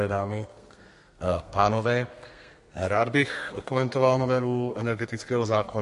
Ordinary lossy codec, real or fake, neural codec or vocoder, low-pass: MP3, 48 kbps; fake; codec, 32 kHz, 1.9 kbps, SNAC; 14.4 kHz